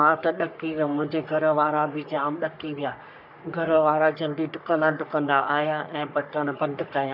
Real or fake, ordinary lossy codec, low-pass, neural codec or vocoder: fake; none; 5.4 kHz; codec, 44.1 kHz, 3.4 kbps, Pupu-Codec